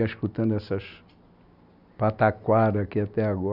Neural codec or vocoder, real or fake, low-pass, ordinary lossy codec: none; real; 5.4 kHz; none